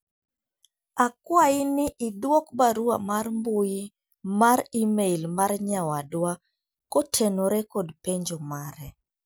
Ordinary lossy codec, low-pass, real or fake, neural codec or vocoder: none; none; real; none